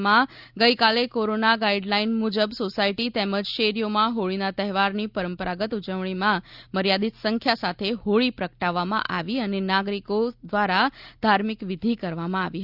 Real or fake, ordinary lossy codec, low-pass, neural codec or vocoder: real; Opus, 64 kbps; 5.4 kHz; none